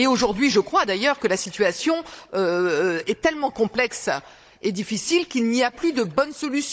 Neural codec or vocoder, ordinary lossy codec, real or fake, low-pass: codec, 16 kHz, 16 kbps, FunCodec, trained on Chinese and English, 50 frames a second; none; fake; none